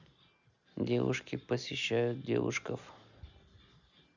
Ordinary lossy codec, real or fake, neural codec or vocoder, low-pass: none; real; none; 7.2 kHz